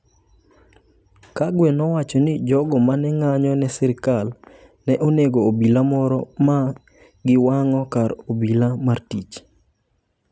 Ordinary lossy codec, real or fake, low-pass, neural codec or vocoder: none; real; none; none